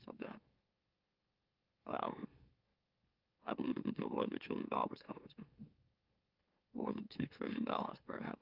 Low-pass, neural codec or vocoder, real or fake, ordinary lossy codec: 5.4 kHz; autoencoder, 44.1 kHz, a latent of 192 numbers a frame, MeloTTS; fake; Opus, 24 kbps